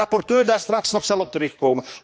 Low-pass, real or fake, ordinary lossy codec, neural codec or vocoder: none; fake; none; codec, 16 kHz, 2 kbps, X-Codec, HuBERT features, trained on general audio